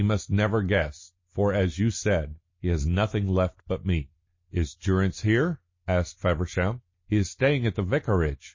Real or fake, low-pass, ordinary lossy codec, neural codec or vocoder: real; 7.2 kHz; MP3, 32 kbps; none